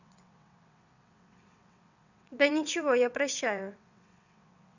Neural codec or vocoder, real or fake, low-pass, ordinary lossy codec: vocoder, 22.05 kHz, 80 mel bands, WaveNeXt; fake; 7.2 kHz; none